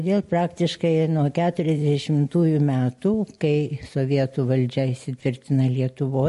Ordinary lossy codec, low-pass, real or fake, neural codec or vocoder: MP3, 48 kbps; 14.4 kHz; real; none